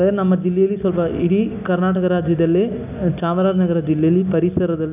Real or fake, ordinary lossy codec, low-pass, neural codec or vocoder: real; none; 3.6 kHz; none